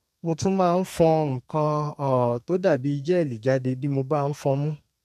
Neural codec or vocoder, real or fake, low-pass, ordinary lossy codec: codec, 32 kHz, 1.9 kbps, SNAC; fake; 14.4 kHz; none